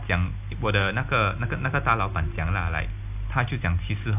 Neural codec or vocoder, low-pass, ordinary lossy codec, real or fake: none; 3.6 kHz; AAC, 32 kbps; real